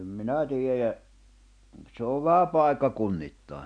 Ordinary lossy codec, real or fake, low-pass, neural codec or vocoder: none; real; 9.9 kHz; none